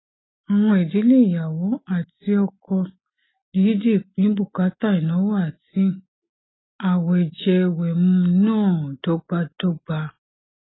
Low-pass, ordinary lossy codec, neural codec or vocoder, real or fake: 7.2 kHz; AAC, 16 kbps; none; real